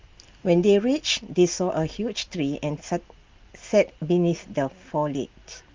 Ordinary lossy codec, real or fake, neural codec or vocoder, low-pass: Opus, 32 kbps; real; none; 7.2 kHz